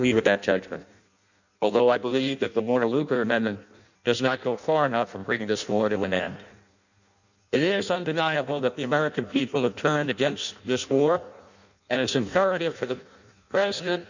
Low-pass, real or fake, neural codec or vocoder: 7.2 kHz; fake; codec, 16 kHz in and 24 kHz out, 0.6 kbps, FireRedTTS-2 codec